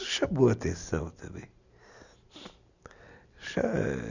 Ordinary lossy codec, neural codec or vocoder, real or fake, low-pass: none; none; real; 7.2 kHz